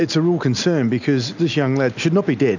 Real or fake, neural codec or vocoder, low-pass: real; none; 7.2 kHz